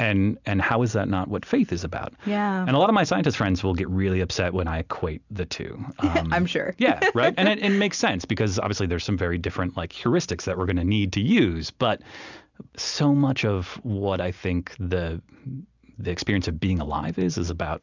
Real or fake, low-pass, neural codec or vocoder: real; 7.2 kHz; none